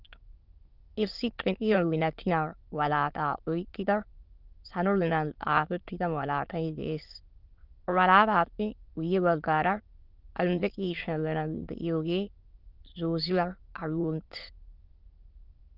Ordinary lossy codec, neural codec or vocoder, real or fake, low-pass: Opus, 24 kbps; autoencoder, 22.05 kHz, a latent of 192 numbers a frame, VITS, trained on many speakers; fake; 5.4 kHz